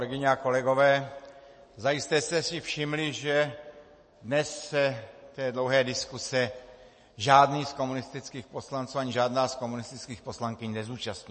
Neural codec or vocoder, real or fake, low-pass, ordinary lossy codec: none; real; 10.8 kHz; MP3, 32 kbps